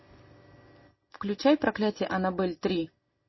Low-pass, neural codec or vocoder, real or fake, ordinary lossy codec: 7.2 kHz; none; real; MP3, 24 kbps